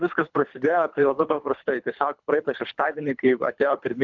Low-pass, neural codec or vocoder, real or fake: 7.2 kHz; codec, 24 kHz, 3 kbps, HILCodec; fake